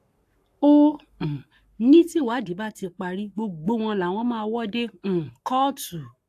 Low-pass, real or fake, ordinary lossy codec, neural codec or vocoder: 14.4 kHz; fake; AAC, 64 kbps; codec, 44.1 kHz, 7.8 kbps, Pupu-Codec